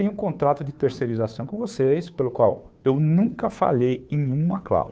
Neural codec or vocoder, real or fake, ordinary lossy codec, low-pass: codec, 16 kHz, 2 kbps, FunCodec, trained on Chinese and English, 25 frames a second; fake; none; none